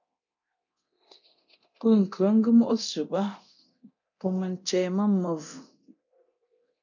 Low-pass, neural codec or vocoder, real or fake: 7.2 kHz; codec, 24 kHz, 0.9 kbps, DualCodec; fake